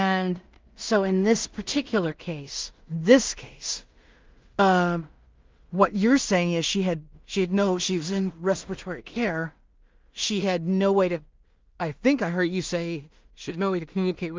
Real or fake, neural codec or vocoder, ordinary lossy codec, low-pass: fake; codec, 16 kHz in and 24 kHz out, 0.4 kbps, LongCat-Audio-Codec, two codebook decoder; Opus, 24 kbps; 7.2 kHz